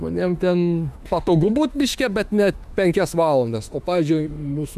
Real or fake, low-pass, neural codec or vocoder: fake; 14.4 kHz; autoencoder, 48 kHz, 32 numbers a frame, DAC-VAE, trained on Japanese speech